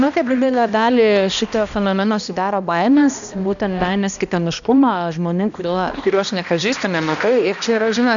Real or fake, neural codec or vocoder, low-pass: fake; codec, 16 kHz, 1 kbps, X-Codec, HuBERT features, trained on balanced general audio; 7.2 kHz